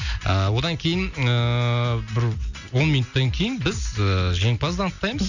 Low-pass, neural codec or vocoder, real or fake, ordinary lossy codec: 7.2 kHz; none; real; none